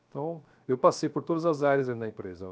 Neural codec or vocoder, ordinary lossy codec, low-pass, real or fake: codec, 16 kHz, 0.7 kbps, FocalCodec; none; none; fake